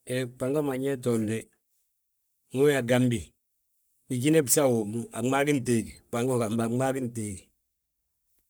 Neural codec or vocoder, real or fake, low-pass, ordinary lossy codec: codec, 44.1 kHz, 3.4 kbps, Pupu-Codec; fake; none; none